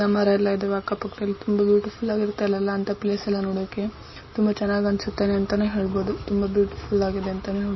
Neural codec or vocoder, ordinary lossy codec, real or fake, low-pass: none; MP3, 24 kbps; real; 7.2 kHz